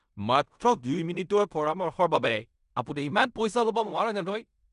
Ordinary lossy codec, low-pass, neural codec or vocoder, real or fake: none; 10.8 kHz; codec, 16 kHz in and 24 kHz out, 0.4 kbps, LongCat-Audio-Codec, fine tuned four codebook decoder; fake